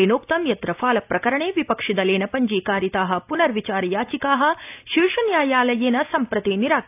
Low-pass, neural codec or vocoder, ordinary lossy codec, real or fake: 3.6 kHz; none; AAC, 32 kbps; real